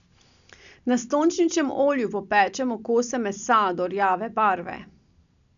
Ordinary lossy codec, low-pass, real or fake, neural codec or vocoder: none; 7.2 kHz; real; none